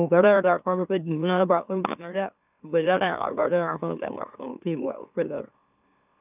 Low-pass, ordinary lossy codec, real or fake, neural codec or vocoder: 3.6 kHz; none; fake; autoencoder, 44.1 kHz, a latent of 192 numbers a frame, MeloTTS